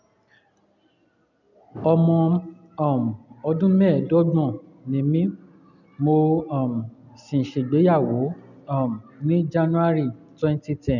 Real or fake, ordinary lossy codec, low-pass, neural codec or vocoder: real; none; 7.2 kHz; none